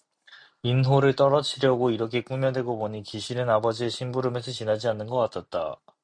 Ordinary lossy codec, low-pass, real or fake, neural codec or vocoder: MP3, 64 kbps; 9.9 kHz; real; none